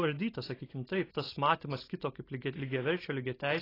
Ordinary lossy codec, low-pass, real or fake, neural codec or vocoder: AAC, 24 kbps; 5.4 kHz; real; none